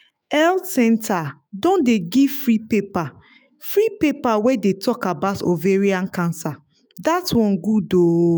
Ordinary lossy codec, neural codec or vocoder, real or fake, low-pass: none; autoencoder, 48 kHz, 128 numbers a frame, DAC-VAE, trained on Japanese speech; fake; none